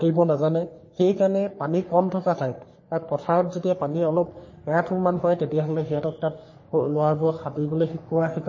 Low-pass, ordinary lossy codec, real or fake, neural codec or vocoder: 7.2 kHz; MP3, 32 kbps; fake; codec, 44.1 kHz, 3.4 kbps, Pupu-Codec